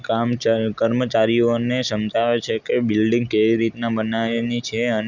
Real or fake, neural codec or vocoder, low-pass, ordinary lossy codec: real; none; 7.2 kHz; none